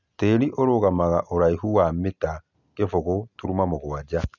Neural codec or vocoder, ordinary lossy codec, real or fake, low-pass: none; none; real; 7.2 kHz